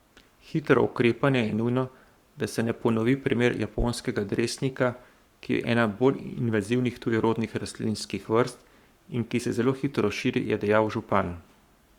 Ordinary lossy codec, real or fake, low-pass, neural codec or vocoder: Opus, 64 kbps; fake; 19.8 kHz; codec, 44.1 kHz, 7.8 kbps, Pupu-Codec